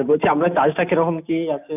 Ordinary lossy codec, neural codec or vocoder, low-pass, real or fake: none; none; 3.6 kHz; real